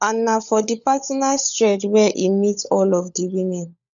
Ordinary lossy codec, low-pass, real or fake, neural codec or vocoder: none; 7.2 kHz; fake; codec, 16 kHz, 16 kbps, FunCodec, trained on LibriTTS, 50 frames a second